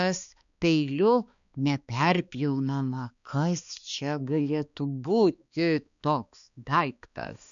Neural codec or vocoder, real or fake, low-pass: codec, 16 kHz, 2 kbps, X-Codec, HuBERT features, trained on balanced general audio; fake; 7.2 kHz